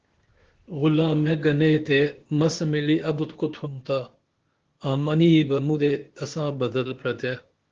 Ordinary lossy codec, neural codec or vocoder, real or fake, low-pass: Opus, 16 kbps; codec, 16 kHz, 0.8 kbps, ZipCodec; fake; 7.2 kHz